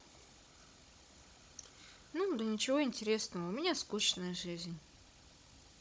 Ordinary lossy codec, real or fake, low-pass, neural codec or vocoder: none; fake; none; codec, 16 kHz, 16 kbps, FunCodec, trained on Chinese and English, 50 frames a second